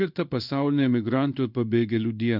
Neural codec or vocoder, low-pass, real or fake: codec, 16 kHz in and 24 kHz out, 1 kbps, XY-Tokenizer; 5.4 kHz; fake